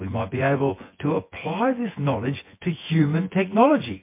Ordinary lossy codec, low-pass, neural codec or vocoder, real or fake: MP3, 24 kbps; 3.6 kHz; vocoder, 24 kHz, 100 mel bands, Vocos; fake